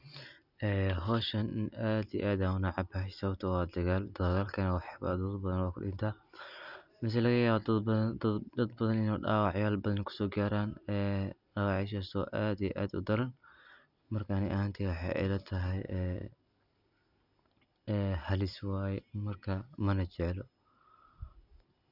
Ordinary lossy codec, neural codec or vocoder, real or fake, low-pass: none; none; real; 5.4 kHz